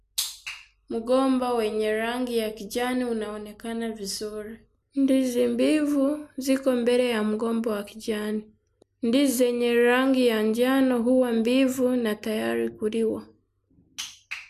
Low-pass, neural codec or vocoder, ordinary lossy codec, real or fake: 14.4 kHz; none; MP3, 96 kbps; real